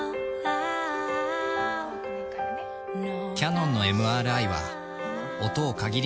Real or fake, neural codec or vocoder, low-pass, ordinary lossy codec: real; none; none; none